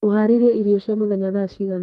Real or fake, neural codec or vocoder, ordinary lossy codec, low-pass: fake; codec, 32 kHz, 1.9 kbps, SNAC; Opus, 32 kbps; 14.4 kHz